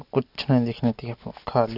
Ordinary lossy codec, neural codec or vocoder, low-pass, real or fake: none; none; 5.4 kHz; real